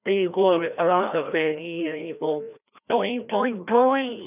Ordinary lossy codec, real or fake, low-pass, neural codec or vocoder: none; fake; 3.6 kHz; codec, 16 kHz, 1 kbps, FreqCodec, larger model